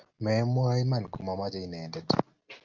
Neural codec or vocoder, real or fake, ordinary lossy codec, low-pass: none; real; Opus, 24 kbps; 7.2 kHz